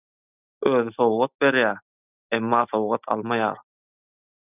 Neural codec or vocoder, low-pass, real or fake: none; 3.6 kHz; real